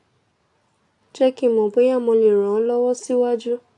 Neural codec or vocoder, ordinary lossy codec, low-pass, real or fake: none; AAC, 64 kbps; 10.8 kHz; real